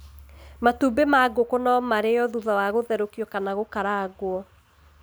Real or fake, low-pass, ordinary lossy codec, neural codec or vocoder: real; none; none; none